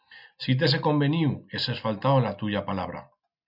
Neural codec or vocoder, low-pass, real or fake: none; 5.4 kHz; real